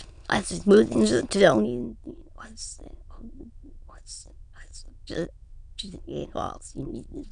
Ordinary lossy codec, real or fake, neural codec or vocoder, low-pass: none; fake; autoencoder, 22.05 kHz, a latent of 192 numbers a frame, VITS, trained on many speakers; 9.9 kHz